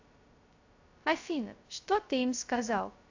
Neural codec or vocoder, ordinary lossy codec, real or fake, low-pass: codec, 16 kHz, 0.2 kbps, FocalCodec; AAC, 48 kbps; fake; 7.2 kHz